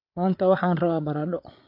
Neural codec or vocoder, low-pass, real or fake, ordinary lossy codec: codec, 16 kHz, 8 kbps, FreqCodec, larger model; 5.4 kHz; fake; AAC, 48 kbps